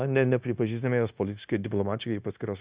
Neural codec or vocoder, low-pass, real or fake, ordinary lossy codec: codec, 16 kHz, 0.9 kbps, LongCat-Audio-Codec; 3.6 kHz; fake; Opus, 64 kbps